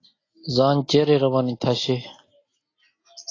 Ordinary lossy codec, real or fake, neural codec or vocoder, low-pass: AAC, 32 kbps; real; none; 7.2 kHz